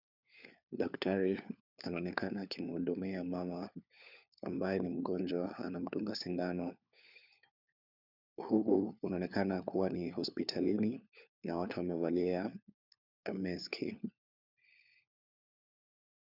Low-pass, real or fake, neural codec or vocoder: 5.4 kHz; fake; codec, 16 kHz, 4 kbps, FunCodec, trained on LibriTTS, 50 frames a second